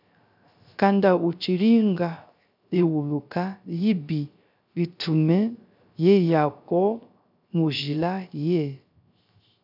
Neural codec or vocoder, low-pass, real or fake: codec, 16 kHz, 0.3 kbps, FocalCodec; 5.4 kHz; fake